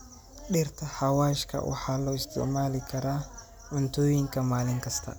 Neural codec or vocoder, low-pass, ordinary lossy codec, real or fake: none; none; none; real